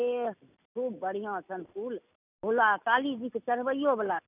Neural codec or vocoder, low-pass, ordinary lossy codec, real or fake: none; 3.6 kHz; none; real